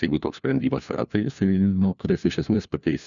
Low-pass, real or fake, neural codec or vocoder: 7.2 kHz; fake; codec, 16 kHz, 1 kbps, FunCodec, trained on LibriTTS, 50 frames a second